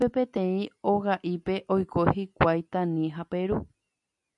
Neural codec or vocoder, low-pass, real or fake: none; 10.8 kHz; real